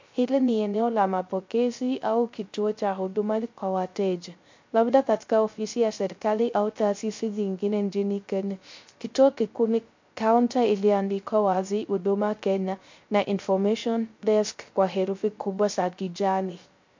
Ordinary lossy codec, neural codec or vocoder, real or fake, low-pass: MP3, 48 kbps; codec, 16 kHz, 0.3 kbps, FocalCodec; fake; 7.2 kHz